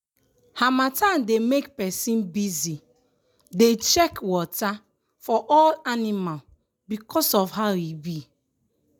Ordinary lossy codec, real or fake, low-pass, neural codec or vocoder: none; real; none; none